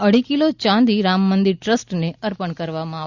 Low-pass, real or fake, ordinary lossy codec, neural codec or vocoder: 7.2 kHz; real; Opus, 64 kbps; none